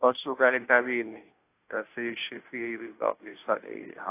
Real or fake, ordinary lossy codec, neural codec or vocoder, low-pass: fake; AAC, 24 kbps; codec, 16 kHz, 0.5 kbps, FunCodec, trained on Chinese and English, 25 frames a second; 3.6 kHz